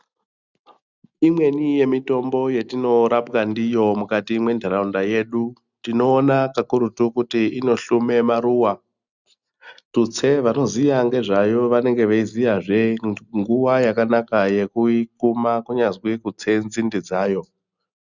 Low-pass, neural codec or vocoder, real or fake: 7.2 kHz; none; real